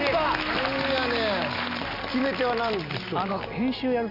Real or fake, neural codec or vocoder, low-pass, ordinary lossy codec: real; none; 5.4 kHz; none